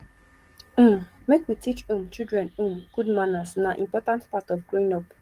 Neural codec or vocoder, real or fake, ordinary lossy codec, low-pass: codec, 44.1 kHz, 7.8 kbps, DAC; fake; Opus, 24 kbps; 14.4 kHz